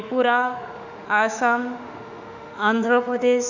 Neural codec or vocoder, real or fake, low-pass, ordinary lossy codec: autoencoder, 48 kHz, 32 numbers a frame, DAC-VAE, trained on Japanese speech; fake; 7.2 kHz; none